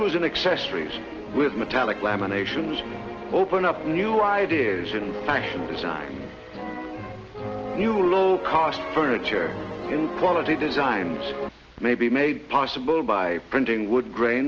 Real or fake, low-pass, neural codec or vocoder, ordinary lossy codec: real; 7.2 kHz; none; Opus, 16 kbps